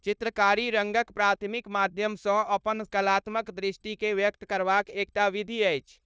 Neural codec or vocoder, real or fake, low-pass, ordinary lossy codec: codec, 16 kHz, 0.9 kbps, LongCat-Audio-Codec; fake; none; none